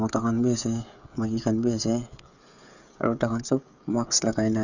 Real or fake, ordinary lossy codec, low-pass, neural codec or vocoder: fake; none; 7.2 kHz; codec, 16 kHz, 16 kbps, FreqCodec, smaller model